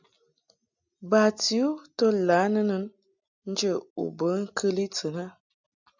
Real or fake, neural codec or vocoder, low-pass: real; none; 7.2 kHz